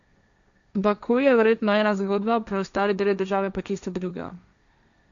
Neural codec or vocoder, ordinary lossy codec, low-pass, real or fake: codec, 16 kHz, 1.1 kbps, Voila-Tokenizer; none; 7.2 kHz; fake